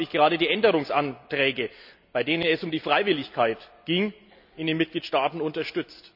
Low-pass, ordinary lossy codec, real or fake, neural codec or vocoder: 5.4 kHz; none; real; none